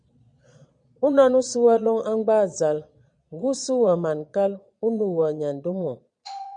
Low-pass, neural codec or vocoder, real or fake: 9.9 kHz; vocoder, 22.05 kHz, 80 mel bands, Vocos; fake